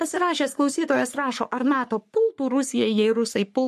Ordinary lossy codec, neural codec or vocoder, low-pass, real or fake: MP3, 64 kbps; codec, 44.1 kHz, 3.4 kbps, Pupu-Codec; 14.4 kHz; fake